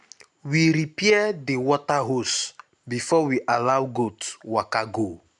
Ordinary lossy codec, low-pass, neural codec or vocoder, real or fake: none; 10.8 kHz; none; real